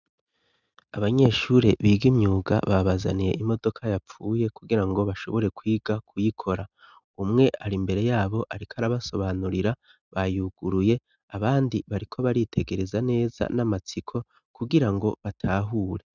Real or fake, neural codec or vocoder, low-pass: real; none; 7.2 kHz